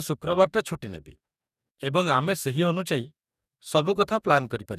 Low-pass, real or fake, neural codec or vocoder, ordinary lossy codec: 14.4 kHz; fake; codec, 44.1 kHz, 2.6 kbps, DAC; none